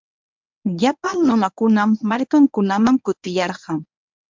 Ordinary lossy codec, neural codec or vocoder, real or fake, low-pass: MP3, 64 kbps; codec, 24 kHz, 0.9 kbps, WavTokenizer, medium speech release version 1; fake; 7.2 kHz